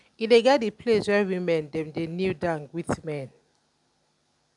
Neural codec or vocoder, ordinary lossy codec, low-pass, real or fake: none; none; 10.8 kHz; real